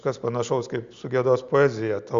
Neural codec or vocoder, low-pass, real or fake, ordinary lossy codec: none; 7.2 kHz; real; Opus, 64 kbps